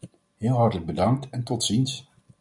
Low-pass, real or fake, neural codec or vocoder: 10.8 kHz; real; none